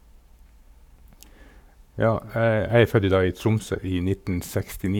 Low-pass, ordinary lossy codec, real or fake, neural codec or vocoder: 19.8 kHz; none; fake; codec, 44.1 kHz, 7.8 kbps, Pupu-Codec